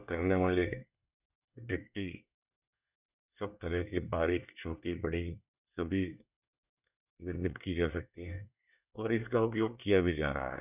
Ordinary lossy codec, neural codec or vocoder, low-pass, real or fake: none; codec, 24 kHz, 1 kbps, SNAC; 3.6 kHz; fake